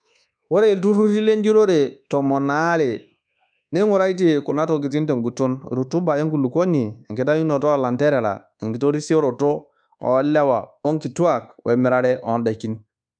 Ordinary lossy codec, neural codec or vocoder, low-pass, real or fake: none; codec, 24 kHz, 1.2 kbps, DualCodec; 9.9 kHz; fake